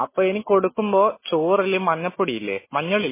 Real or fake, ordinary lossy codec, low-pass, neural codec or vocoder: real; MP3, 16 kbps; 3.6 kHz; none